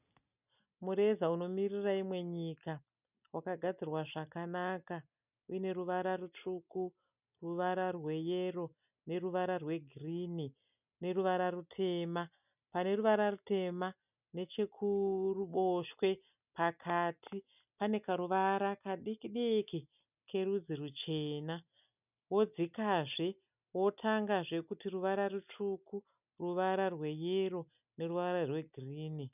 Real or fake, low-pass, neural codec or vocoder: real; 3.6 kHz; none